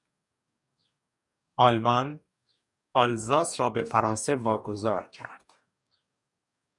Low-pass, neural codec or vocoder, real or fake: 10.8 kHz; codec, 44.1 kHz, 2.6 kbps, DAC; fake